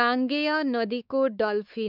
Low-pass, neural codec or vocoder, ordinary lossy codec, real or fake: 5.4 kHz; codec, 16 kHz, 4 kbps, X-Codec, WavLM features, trained on Multilingual LibriSpeech; none; fake